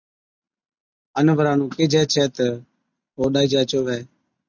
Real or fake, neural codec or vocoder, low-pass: real; none; 7.2 kHz